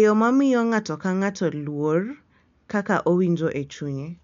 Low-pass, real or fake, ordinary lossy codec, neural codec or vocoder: 7.2 kHz; real; MP3, 64 kbps; none